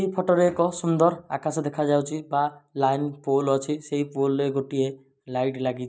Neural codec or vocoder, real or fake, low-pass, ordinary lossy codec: none; real; none; none